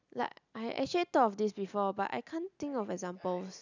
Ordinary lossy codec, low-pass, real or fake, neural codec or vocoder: none; 7.2 kHz; real; none